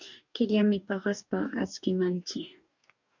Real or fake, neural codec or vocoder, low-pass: fake; codec, 44.1 kHz, 2.6 kbps, DAC; 7.2 kHz